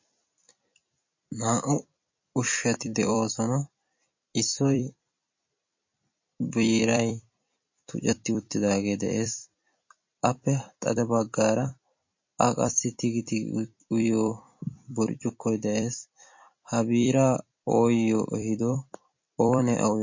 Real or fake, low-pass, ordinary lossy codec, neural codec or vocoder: fake; 7.2 kHz; MP3, 32 kbps; vocoder, 44.1 kHz, 128 mel bands every 256 samples, BigVGAN v2